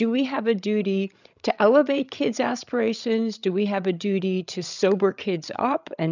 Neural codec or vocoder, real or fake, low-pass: codec, 16 kHz, 16 kbps, FreqCodec, larger model; fake; 7.2 kHz